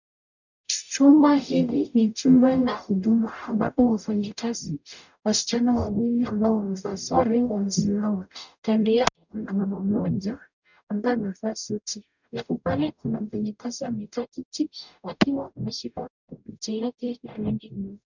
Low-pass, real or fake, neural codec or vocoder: 7.2 kHz; fake; codec, 44.1 kHz, 0.9 kbps, DAC